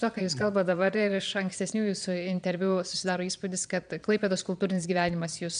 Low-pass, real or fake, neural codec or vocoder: 9.9 kHz; fake; vocoder, 22.05 kHz, 80 mel bands, Vocos